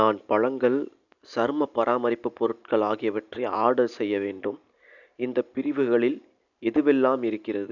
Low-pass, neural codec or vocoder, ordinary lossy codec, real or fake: 7.2 kHz; none; none; real